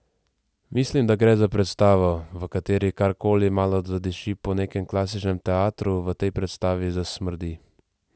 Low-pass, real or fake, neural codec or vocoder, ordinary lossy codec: none; real; none; none